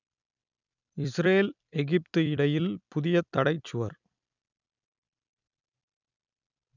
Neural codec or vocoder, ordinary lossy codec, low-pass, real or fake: vocoder, 44.1 kHz, 128 mel bands every 256 samples, BigVGAN v2; none; 7.2 kHz; fake